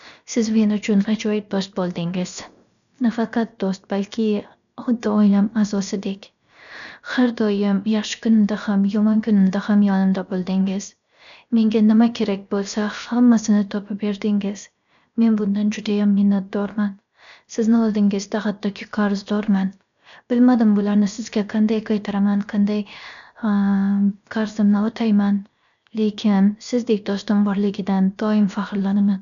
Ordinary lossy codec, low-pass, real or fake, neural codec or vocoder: none; 7.2 kHz; fake; codec, 16 kHz, 0.7 kbps, FocalCodec